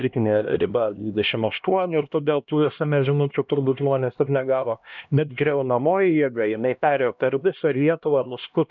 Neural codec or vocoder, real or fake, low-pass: codec, 16 kHz, 1 kbps, X-Codec, HuBERT features, trained on LibriSpeech; fake; 7.2 kHz